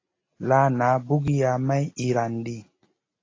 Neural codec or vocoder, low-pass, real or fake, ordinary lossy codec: none; 7.2 kHz; real; AAC, 32 kbps